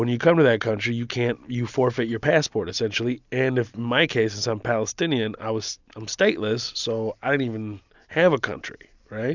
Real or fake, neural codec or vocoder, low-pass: real; none; 7.2 kHz